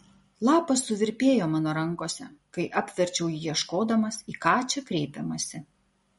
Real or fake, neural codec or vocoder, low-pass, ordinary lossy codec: real; none; 19.8 kHz; MP3, 48 kbps